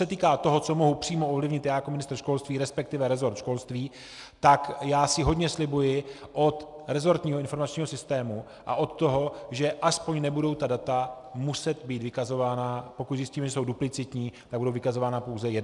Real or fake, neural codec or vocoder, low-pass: real; none; 10.8 kHz